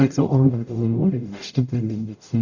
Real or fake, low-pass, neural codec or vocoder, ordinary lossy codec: fake; 7.2 kHz; codec, 44.1 kHz, 0.9 kbps, DAC; none